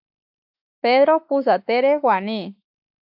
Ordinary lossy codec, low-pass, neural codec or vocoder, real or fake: AAC, 48 kbps; 5.4 kHz; autoencoder, 48 kHz, 32 numbers a frame, DAC-VAE, trained on Japanese speech; fake